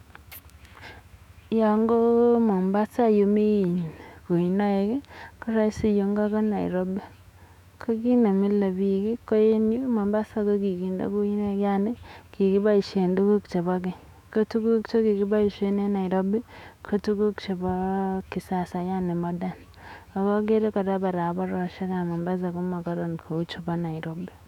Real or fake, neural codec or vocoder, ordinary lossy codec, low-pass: fake; autoencoder, 48 kHz, 128 numbers a frame, DAC-VAE, trained on Japanese speech; none; 19.8 kHz